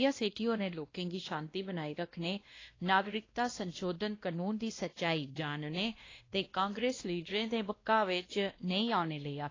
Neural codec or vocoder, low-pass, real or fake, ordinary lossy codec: codec, 16 kHz, 1 kbps, X-Codec, WavLM features, trained on Multilingual LibriSpeech; 7.2 kHz; fake; AAC, 32 kbps